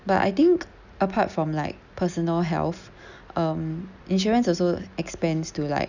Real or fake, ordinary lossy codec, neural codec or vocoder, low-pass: real; none; none; 7.2 kHz